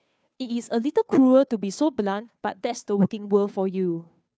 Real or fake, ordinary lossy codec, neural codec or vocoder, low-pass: fake; none; codec, 16 kHz, 2 kbps, FunCodec, trained on Chinese and English, 25 frames a second; none